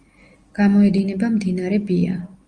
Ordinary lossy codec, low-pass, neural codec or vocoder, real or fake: Opus, 32 kbps; 9.9 kHz; none; real